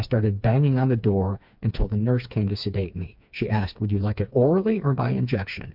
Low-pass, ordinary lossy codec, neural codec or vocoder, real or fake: 5.4 kHz; MP3, 48 kbps; codec, 16 kHz, 4 kbps, FreqCodec, smaller model; fake